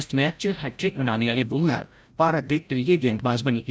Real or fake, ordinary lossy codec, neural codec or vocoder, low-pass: fake; none; codec, 16 kHz, 0.5 kbps, FreqCodec, larger model; none